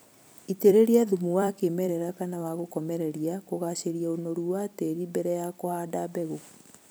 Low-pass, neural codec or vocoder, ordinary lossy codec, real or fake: none; vocoder, 44.1 kHz, 128 mel bands every 256 samples, BigVGAN v2; none; fake